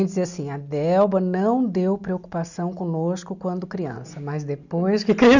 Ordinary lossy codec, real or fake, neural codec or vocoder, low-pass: none; real; none; 7.2 kHz